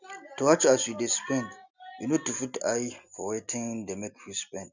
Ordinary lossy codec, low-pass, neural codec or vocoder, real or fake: none; 7.2 kHz; none; real